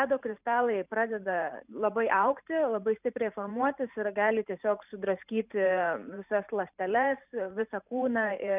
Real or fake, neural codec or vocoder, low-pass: fake; vocoder, 44.1 kHz, 128 mel bands every 512 samples, BigVGAN v2; 3.6 kHz